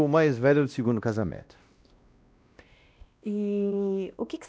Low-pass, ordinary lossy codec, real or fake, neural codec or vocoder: none; none; fake; codec, 16 kHz, 1 kbps, X-Codec, WavLM features, trained on Multilingual LibriSpeech